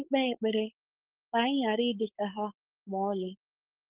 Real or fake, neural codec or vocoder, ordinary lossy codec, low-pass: fake; codec, 16 kHz, 4.8 kbps, FACodec; Opus, 16 kbps; 3.6 kHz